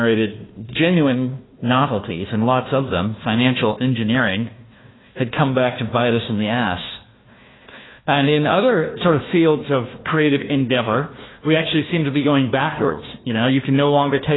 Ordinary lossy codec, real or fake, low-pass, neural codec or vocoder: AAC, 16 kbps; fake; 7.2 kHz; codec, 16 kHz, 1 kbps, FunCodec, trained on Chinese and English, 50 frames a second